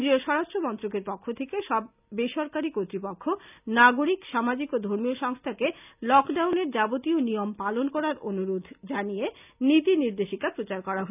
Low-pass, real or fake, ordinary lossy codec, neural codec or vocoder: 3.6 kHz; real; none; none